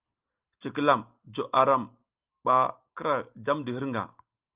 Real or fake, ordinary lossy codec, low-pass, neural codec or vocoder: real; Opus, 24 kbps; 3.6 kHz; none